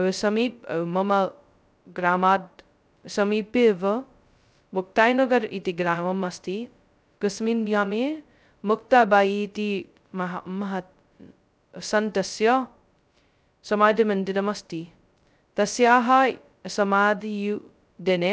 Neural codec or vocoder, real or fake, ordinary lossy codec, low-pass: codec, 16 kHz, 0.2 kbps, FocalCodec; fake; none; none